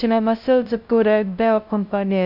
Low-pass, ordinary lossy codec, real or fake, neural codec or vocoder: 5.4 kHz; MP3, 48 kbps; fake; codec, 16 kHz, 0.5 kbps, FunCodec, trained on LibriTTS, 25 frames a second